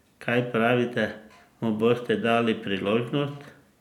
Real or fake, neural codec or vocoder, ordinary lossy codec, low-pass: real; none; none; 19.8 kHz